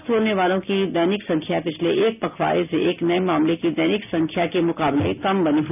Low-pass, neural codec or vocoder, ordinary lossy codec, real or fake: 3.6 kHz; none; none; real